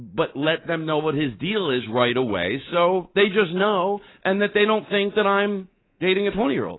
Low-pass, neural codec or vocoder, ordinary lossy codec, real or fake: 7.2 kHz; codec, 24 kHz, 3.1 kbps, DualCodec; AAC, 16 kbps; fake